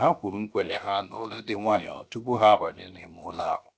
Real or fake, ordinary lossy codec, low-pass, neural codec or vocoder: fake; none; none; codec, 16 kHz, 0.7 kbps, FocalCodec